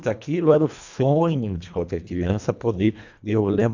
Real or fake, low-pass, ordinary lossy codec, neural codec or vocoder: fake; 7.2 kHz; none; codec, 24 kHz, 1.5 kbps, HILCodec